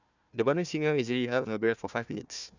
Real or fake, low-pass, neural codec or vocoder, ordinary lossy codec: fake; 7.2 kHz; codec, 16 kHz, 1 kbps, FunCodec, trained on Chinese and English, 50 frames a second; none